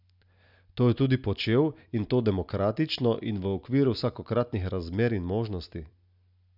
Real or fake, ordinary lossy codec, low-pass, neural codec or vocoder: real; none; 5.4 kHz; none